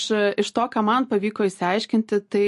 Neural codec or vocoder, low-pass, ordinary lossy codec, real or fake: none; 14.4 kHz; MP3, 48 kbps; real